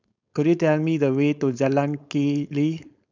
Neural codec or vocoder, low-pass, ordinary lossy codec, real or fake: codec, 16 kHz, 4.8 kbps, FACodec; 7.2 kHz; none; fake